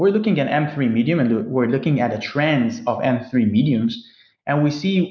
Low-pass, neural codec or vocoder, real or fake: 7.2 kHz; none; real